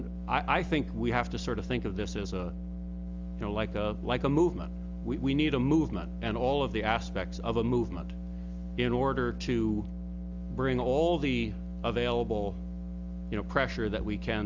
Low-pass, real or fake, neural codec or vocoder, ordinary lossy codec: 7.2 kHz; real; none; Opus, 32 kbps